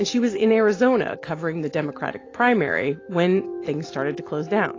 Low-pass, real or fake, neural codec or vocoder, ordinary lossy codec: 7.2 kHz; real; none; AAC, 32 kbps